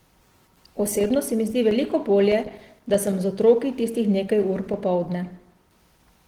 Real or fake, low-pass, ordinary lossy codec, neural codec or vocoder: real; 19.8 kHz; Opus, 16 kbps; none